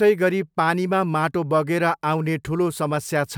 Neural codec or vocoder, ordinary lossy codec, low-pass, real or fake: none; none; none; real